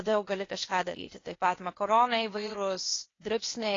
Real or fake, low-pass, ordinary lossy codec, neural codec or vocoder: fake; 7.2 kHz; AAC, 32 kbps; codec, 16 kHz, 0.8 kbps, ZipCodec